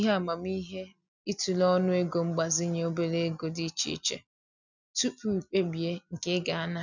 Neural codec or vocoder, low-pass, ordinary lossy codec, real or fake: none; 7.2 kHz; none; real